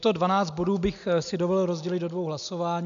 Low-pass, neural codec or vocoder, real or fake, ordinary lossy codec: 7.2 kHz; none; real; MP3, 96 kbps